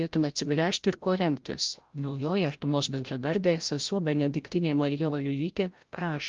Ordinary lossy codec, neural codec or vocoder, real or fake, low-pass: Opus, 32 kbps; codec, 16 kHz, 0.5 kbps, FreqCodec, larger model; fake; 7.2 kHz